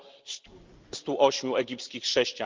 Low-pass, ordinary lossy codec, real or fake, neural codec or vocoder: 7.2 kHz; Opus, 32 kbps; real; none